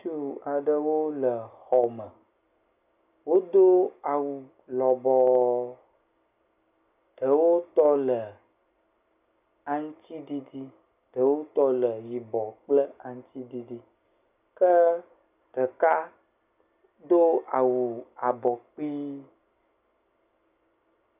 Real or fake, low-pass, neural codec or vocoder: real; 3.6 kHz; none